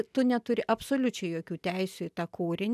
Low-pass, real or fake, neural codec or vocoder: 14.4 kHz; real; none